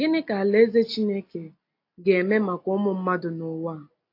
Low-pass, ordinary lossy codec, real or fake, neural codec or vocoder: 5.4 kHz; AAC, 32 kbps; real; none